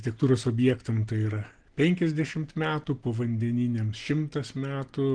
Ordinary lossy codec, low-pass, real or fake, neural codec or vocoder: Opus, 16 kbps; 9.9 kHz; real; none